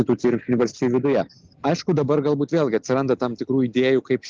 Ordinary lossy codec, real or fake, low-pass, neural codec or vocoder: Opus, 32 kbps; real; 7.2 kHz; none